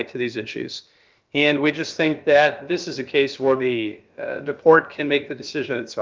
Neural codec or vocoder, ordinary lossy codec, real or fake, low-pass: codec, 16 kHz, about 1 kbps, DyCAST, with the encoder's durations; Opus, 32 kbps; fake; 7.2 kHz